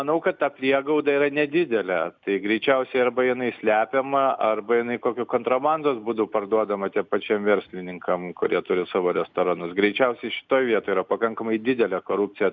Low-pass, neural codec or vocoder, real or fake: 7.2 kHz; none; real